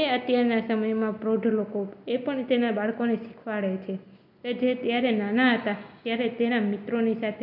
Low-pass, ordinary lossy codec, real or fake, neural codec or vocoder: 5.4 kHz; none; real; none